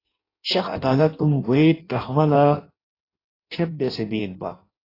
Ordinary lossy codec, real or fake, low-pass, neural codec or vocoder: AAC, 24 kbps; fake; 5.4 kHz; codec, 16 kHz in and 24 kHz out, 0.6 kbps, FireRedTTS-2 codec